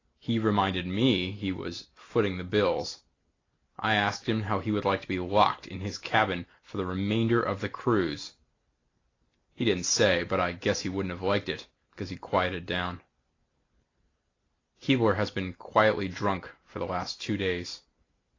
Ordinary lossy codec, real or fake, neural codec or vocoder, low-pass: AAC, 32 kbps; real; none; 7.2 kHz